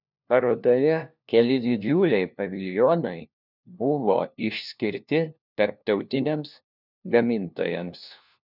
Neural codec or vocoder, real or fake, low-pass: codec, 16 kHz, 1 kbps, FunCodec, trained on LibriTTS, 50 frames a second; fake; 5.4 kHz